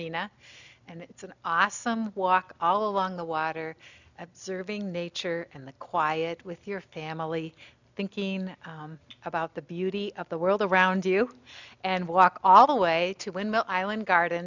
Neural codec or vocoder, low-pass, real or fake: none; 7.2 kHz; real